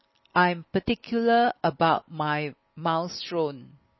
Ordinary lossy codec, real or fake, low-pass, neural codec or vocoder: MP3, 24 kbps; real; 7.2 kHz; none